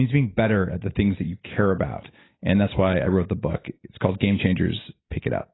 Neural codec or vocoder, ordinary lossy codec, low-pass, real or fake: none; AAC, 16 kbps; 7.2 kHz; real